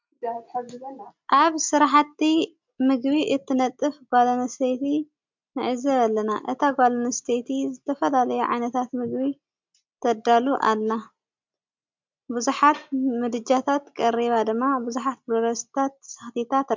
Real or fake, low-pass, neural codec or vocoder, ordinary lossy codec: real; 7.2 kHz; none; MP3, 64 kbps